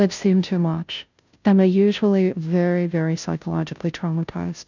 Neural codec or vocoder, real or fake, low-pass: codec, 16 kHz, 0.5 kbps, FunCodec, trained on Chinese and English, 25 frames a second; fake; 7.2 kHz